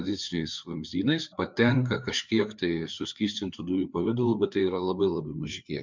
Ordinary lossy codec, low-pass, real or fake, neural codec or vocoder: MP3, 64 kbps; 7.2 kHz; fake; codec, 16 kHz, 4 kbps, FreqCodec, larger model